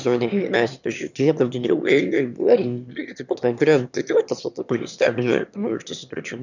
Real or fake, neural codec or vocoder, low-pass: fake; autoencoder, 22.05 kHz, a latent of 192 numbers a frame, VITS, trained on one speaker; 7.2 kHz